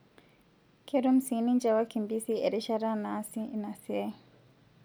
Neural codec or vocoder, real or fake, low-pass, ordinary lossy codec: none; real; none; none